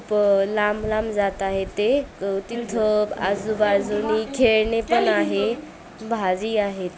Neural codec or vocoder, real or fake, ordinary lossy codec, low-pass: none; real; none; none